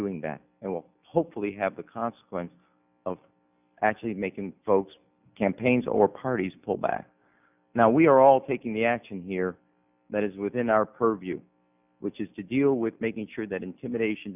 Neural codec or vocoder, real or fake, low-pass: none; real; 3.6 kHz